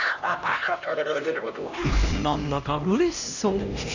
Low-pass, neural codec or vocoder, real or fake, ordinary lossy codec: 7.2 kHz; codec, 16 kHz, 1 kbps, X-Codec, HuBERT features, trained on LibriSpeech; fake; none